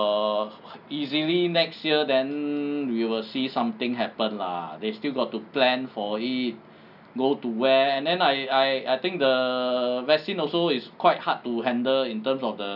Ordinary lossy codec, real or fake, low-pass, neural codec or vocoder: none; real; 5.4 kHz; none